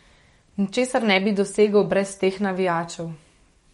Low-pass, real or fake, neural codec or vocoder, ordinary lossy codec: 19.8 kHz; fake; codec, 44.1 kHz, 7.8 kbps, DAC; MP3, 48 kbps